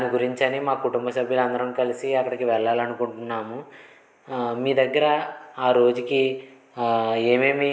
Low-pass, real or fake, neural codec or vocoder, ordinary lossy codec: none; real; none; none